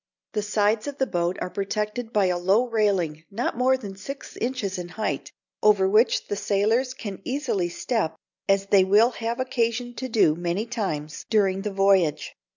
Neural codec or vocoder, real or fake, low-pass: none; real; 7.2 kHz